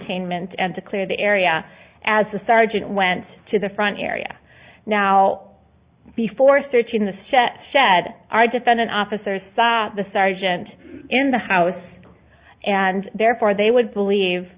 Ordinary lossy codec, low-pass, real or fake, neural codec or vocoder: Opus, 64 kbps; 3.6 kHz; real; none